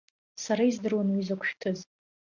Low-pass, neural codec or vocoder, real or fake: 7.2 kHz; none; real